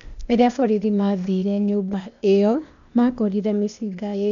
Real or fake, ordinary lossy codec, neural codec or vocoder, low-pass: fake; none; codec, 16 kHz, 0.8 kbps, ZipCodec; 7.2 kHz